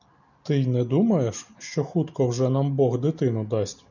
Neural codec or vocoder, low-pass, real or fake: none; 7.2 kHz; real